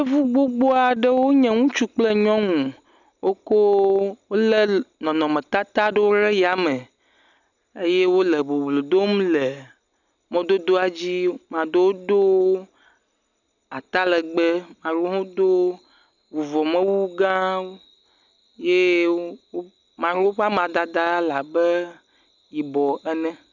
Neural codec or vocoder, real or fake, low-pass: none; real; 7.2 kHz